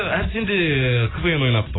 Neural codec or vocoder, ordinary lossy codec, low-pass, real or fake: none; AAC, 16 kbps; 7.2 kHz; real